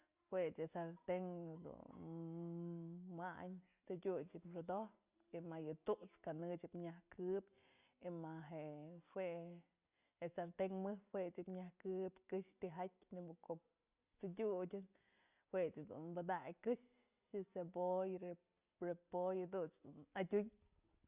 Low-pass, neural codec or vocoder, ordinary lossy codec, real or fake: 3.6 kHz; none; Opus, 64 kbps; real